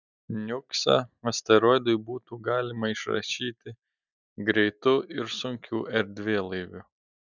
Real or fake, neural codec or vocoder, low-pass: real; none; 7.2 kHz